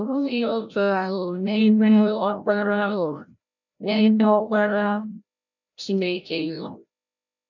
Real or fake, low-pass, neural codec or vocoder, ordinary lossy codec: fake; 7.2 kHz; codec, 16 kHz, 0.5 kbps, FreqCodec, larger model; none